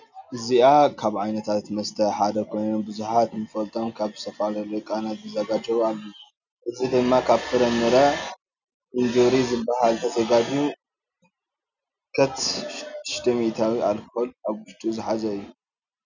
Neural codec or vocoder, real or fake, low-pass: none; real; 7.2 kHz